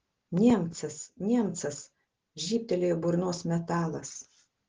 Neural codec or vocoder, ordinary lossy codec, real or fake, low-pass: none; Opus, 16 kbps; real; 7.2 kHz